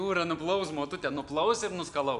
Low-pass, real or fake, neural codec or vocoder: 10.8 kHz; real; none